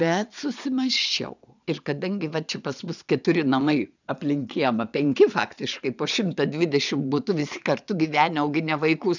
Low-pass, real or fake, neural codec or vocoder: 7.2 kHz; fake; vocoder, 22.05 kHz, 80 mel bands, Vocos